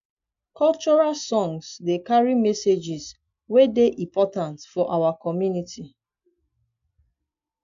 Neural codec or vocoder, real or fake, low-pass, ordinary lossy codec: none; real; 7.2 kHz; AAC, 64 kbps